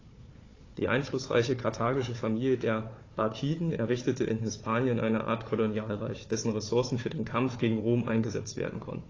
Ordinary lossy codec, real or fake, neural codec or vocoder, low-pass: AAC, 32 kbps; fake; codec, 16 kHz, 4 kbps, FunCodec, trained on Chinese and English, 50 frames a second; 7.2 kHz